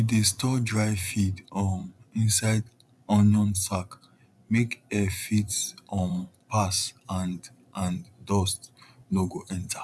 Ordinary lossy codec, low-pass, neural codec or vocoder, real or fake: none; none; vocoder, 24 kHz, 100 mel bands, Vocos; fake